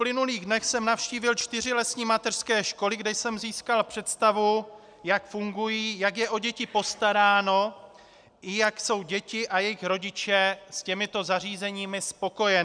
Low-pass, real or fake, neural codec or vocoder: 9.9 kHz; real; none